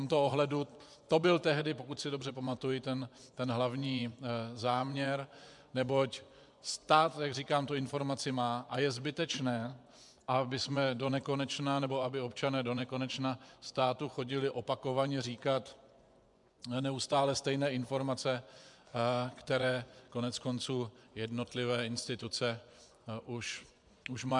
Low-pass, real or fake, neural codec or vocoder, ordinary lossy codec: 10.8 kHz; fake; vocoder, 24 kHz, 100 mel bands, Vocos; MP3, 96 kbps